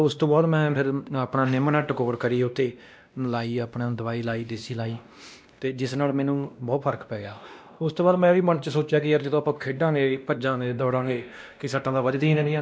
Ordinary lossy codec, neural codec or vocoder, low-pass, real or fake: none; codec, 16 kHz, 1 kbps, X-Codec, WavLM features, trained on Multilingual LibriSpeech; none; fake